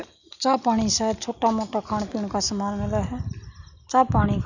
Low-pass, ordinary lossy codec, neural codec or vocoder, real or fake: 7.2 kHz; none; none; real